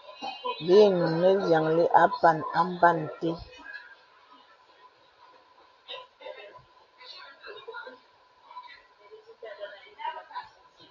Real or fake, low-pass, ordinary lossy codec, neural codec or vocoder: real; 7.2 kHz; AAC, 48 kbps; none